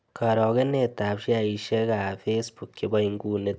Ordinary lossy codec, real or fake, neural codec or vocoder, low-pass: none; real; none; none